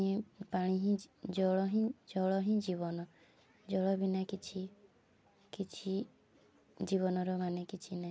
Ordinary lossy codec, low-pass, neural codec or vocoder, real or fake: none; none; none; real